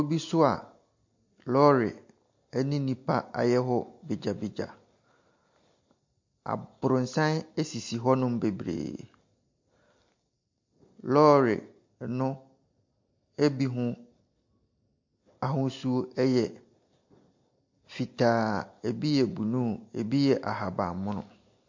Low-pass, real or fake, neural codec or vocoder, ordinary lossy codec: 7.2 kHz; real; none; MP3, 48 kbps